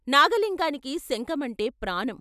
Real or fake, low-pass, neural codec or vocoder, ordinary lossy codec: real; 14.4 kHz; none; none